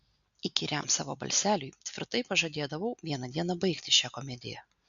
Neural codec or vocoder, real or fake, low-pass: none; real; 7.2 kHz